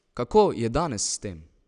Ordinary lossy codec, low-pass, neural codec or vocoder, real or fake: none; 9.9 kHz; none; real